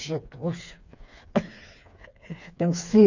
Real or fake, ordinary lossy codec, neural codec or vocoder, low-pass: fake; none; codec, 16 kHz, 4 kbps, FreqCodec, smaller model; 7.2 kHz